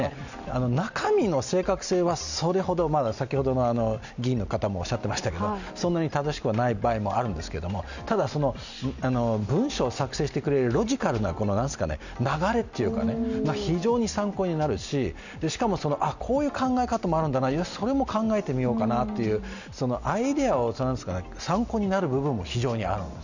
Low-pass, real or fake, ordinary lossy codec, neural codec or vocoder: 7.2 kHz; real; none; none